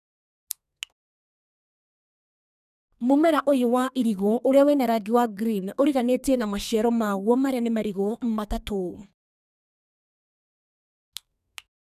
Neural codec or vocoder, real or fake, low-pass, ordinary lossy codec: codec, 32 kHz, 1.9 kbps, SNAC; fake; 14.4 kHz; none